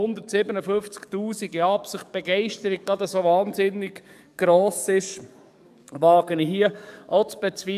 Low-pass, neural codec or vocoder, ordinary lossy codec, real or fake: 14.4 kHz; codec, 44.1 kHz, 7.8 kbps, DAC; none; fake